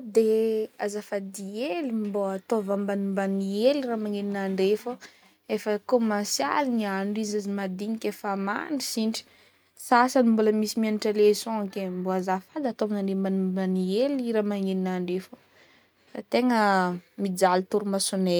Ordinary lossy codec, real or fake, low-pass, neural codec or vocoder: none; real; none; none